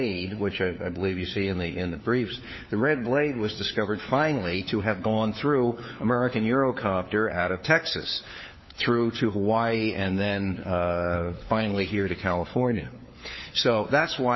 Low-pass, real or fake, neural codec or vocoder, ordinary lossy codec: 7.2 kHz; fake; codec, 16 kHz, 4 kbps, FunCodec, trained on LibriTTS, 50 frames a second; MP3, 24 kbps